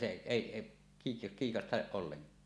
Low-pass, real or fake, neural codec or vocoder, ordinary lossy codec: none; real; none; none